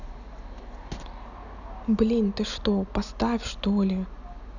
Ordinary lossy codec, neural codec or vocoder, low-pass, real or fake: none; none; 7.2 kHz; real